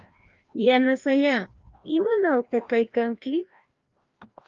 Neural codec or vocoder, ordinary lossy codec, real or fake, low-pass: codec, 16 kHz, 1 kbps, FreqCodec, larger model; Opus, 32 kbps; fake; 7.2 kHz